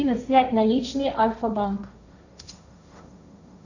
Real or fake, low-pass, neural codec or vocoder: fake; 7.2 kHz; codec, 16 kHz, 1.1 kbps, Voila-Tokenizer